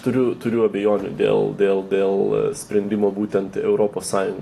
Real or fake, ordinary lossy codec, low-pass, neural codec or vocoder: real; AAC, 48 kbps; 14.4 kHz; none